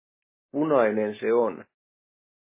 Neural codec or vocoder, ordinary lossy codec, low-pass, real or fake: none; MP3, 16 kbps; 3.6 kHz; real